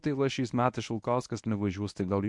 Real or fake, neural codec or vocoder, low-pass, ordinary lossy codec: fake; codec, 24 kHz, 0.9 kbps, WavTokenizer, medium speech release version 1; 10.8 kHz; MP3, 64 kbps